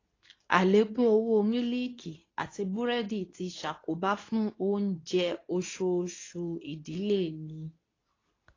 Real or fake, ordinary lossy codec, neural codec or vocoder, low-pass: fake; AAC, 32 kbps; codec, 24 kHz, 0.9 kbps, WavTokenizer, medium speech release version 2; 7.2 kHz